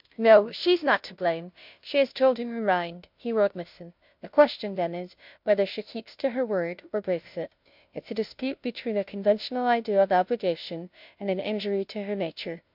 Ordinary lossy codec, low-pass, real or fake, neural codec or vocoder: MP3, 48 kbps; 5.4 kHz; fake; codec, 16 kHz, 0.5 kbps, FunCodec, trained on Chinese and English, 25 frames a second